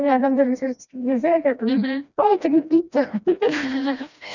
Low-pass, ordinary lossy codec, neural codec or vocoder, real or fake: 7.2 kHz; none; codec, 16 kHz, 1 kbps, FreqCodec, smaller model; fake